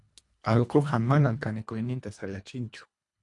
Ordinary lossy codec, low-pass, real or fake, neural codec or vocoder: MP3, 64 kbps; 10.8 kHz; fake; codec, 24 kHz, 1.5 kbps, HILCodec